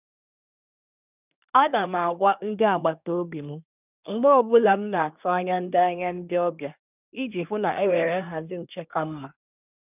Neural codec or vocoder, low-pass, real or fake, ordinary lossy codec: codec, 24 kHz, 1 kbps, SNAC; 3.6 kHz; fake; none